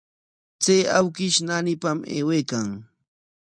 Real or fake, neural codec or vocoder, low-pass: real; none; 9.9 kHz